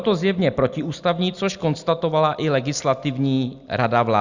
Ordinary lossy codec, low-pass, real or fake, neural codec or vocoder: Opus, 64 kbps; 7.2 kHz; real; none